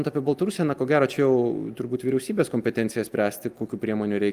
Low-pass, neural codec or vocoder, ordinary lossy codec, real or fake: 14.4 kHz; none; Opus, 32 kbps; real